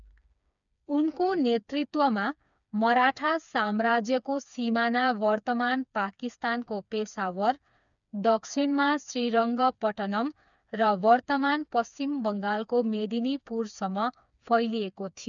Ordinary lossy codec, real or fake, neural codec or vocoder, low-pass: none; fake; codec, 16 kHz, 4 kbps, FreqCodec, smaller model; 7.2 kHz